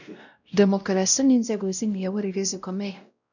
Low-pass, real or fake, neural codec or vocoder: 7.2 kHz; fake; codec, 16 kHz, 0.5 kbps, X-Codec, WavLM features, trained on Multilingual LibriSpeech